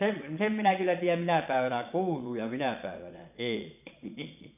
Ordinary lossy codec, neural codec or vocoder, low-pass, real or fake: none; vocoder, 22.05 kHz, 80 mel bands, Vocos; 3.6 kHz; fake